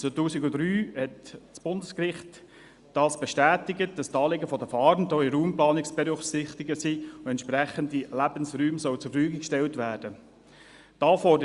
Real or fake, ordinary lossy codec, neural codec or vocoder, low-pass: real; Opus, 64 kbps; none; 10.8 kHz